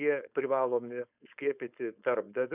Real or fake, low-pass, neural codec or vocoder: fake; 3.6 kHz; codec, 16 kHz, 4.8 kbps, FACodec